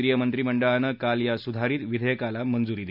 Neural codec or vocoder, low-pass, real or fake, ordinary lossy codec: none; 5.4 kHz; real; none